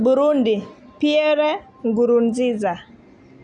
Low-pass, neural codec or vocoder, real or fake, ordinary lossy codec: 10.8 kHz; none; real; none